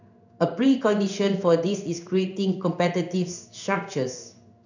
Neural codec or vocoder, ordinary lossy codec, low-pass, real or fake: codec, 16 kHz in and 24 kHz out, 1 kbps, XY-Tokenizer; none; 7.2 kHz; fake